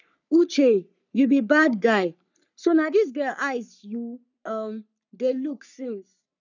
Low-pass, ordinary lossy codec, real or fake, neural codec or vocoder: 7.2 kHz; none; fake; codec, 44.1 kHz, 3.4 kbps, Pupu-Codec